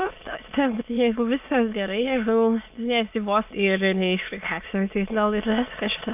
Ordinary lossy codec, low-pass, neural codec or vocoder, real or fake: AAC, 32 kbps; 3.6 kHz; autoencoder, 22.05 kHz, a latent of 192 numbers a frame, VITS, trained on many speakers; fake